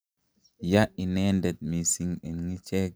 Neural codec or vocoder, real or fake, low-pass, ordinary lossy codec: none; real; none; none